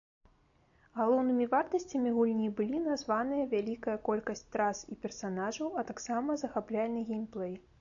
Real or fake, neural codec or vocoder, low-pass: real; none; 7.2 kHz